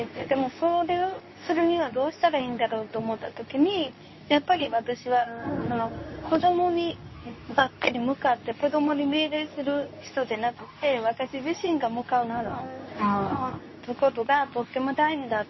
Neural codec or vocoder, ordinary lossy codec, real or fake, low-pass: codec, 24 kHz, 0.9 kbps, WavTokenizer, medium speech release version 2; MP3, 24 kbps; fake; 7.2 kHz